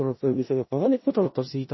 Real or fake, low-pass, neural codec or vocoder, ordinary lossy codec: fake; 7.2 kHz; codec, 16 kHz in and 24 kHz out, 0.4 kbps, LongCat-Audio-Codec, four codebook decoder; MP3, 24 kbps